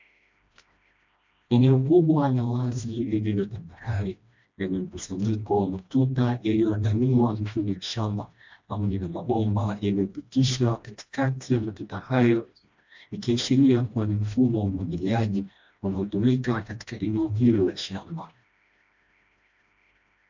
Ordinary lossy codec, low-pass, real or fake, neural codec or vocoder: MP3, 64 kbps; 7.2 kHz; fake; codec, 16 kHz, 1 kbps, FreqCodec, smaller model